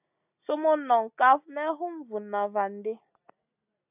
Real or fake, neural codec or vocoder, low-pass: real; none; 3.6 kHz